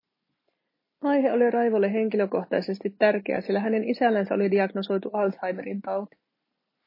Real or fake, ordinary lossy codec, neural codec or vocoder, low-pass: real; MP3, 24 kbps; none; 5.4 kHz